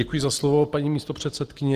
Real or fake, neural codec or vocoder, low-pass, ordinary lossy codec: real; none; 14.4 kHz; Opus, 16 kbps